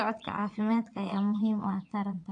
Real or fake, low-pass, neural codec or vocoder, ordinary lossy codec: fake; 9.9 kHz; vocoder, 22.05 kHz, 80 mel bands, Vocos; AAC, 48 kbps